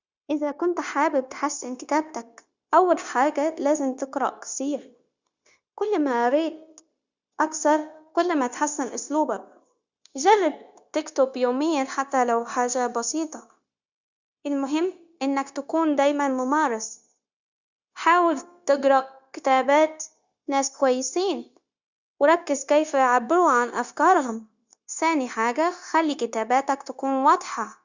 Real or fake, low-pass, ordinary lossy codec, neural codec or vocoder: fake; 7.2 kHz; Opus, 64 kbps; codec, 16 kHz, 0.9 kbps, LongCat-Audio-Codec